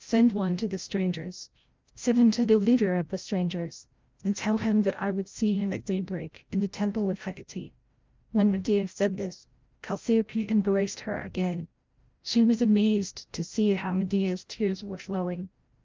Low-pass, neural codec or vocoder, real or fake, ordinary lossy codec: 7.2 kHz; codec, 16 kHz, 0.5 kbps, FreqCodec, larger model; fake; Opus, 24 kbps